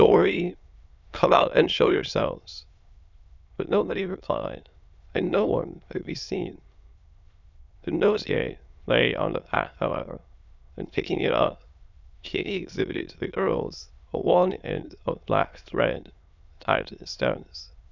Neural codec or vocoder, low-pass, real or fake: autoencoder, 22.05 kHz, a latent of 192 numbers a frame, VITS, trained on many speakers; 7.2 kHz; fake